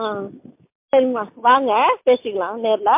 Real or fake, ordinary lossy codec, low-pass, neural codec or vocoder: real; MP3, 32 kbps; 3.6 kHz; none